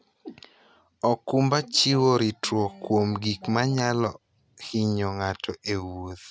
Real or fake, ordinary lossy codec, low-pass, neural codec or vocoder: real; none; none; none